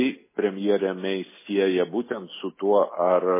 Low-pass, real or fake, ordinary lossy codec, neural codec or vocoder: 3.6 kHz; real; MP3, 16 kbps; none